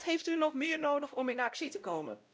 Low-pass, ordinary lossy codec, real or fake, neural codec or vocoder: none; none; fake; codec, 16 kHz, 0.5 kbps, X-Codec, WavLM features, trained on Multilingual LibriSpeech